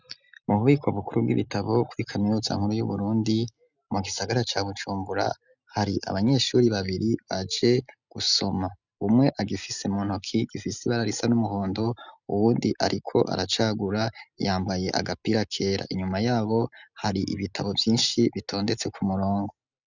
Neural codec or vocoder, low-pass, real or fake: none; 7.2 kHz; real